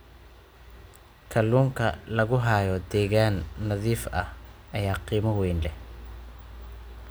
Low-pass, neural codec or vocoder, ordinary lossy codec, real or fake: none; none; none; real